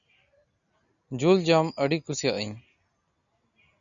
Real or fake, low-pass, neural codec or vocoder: real; 7.2 kHz; none